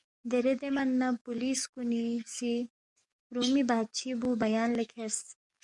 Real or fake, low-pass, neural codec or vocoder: fake; 10.8 kHz; codec, 44.1 kHz, 7.8 kbps, DAC